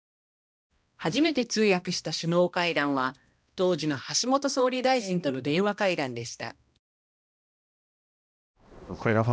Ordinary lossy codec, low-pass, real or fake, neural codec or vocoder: none; none; fake; codec, 16 kHz, 1 kbps, X-Codec, HuBERT features, trained on balanced general audio